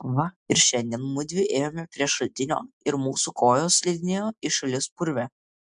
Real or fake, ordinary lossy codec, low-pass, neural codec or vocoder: real; MP3, 64 kbps; 9.9 kHz; none